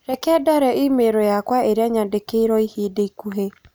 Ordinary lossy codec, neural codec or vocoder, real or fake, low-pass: none; none; real; none